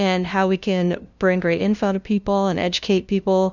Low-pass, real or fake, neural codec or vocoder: 7.2 kHz; fake; codec, 16 kHz, 0.5 kbps, FunCodec, trained on LibriTTS, 25 frames a second